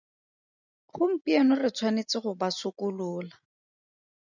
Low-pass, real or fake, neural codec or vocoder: 7.2 kHz; real; none